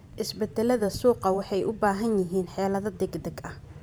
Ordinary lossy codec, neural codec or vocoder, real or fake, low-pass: none; vocoder, 44.1 kHz, 128 mel bands every 512 samples, BigVGAN v2; fake; none